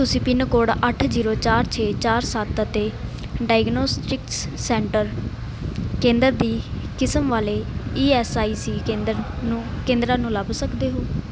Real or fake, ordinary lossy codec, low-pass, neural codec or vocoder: real; none; none; none